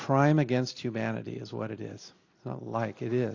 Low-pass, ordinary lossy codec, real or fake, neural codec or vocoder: 7.2 kHz; AAC, 48 kbps; real; none